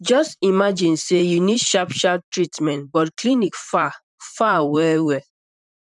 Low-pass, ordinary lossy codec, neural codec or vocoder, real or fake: 10.8 kHz; none; vocoder, 44.1 kHz, 128 mel bands, Pupu-Vocoder; fake